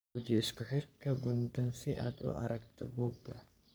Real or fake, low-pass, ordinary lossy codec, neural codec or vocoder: fake; none; none; codec, 44.1 kHz, 3.4 kbps, Pupu-Codec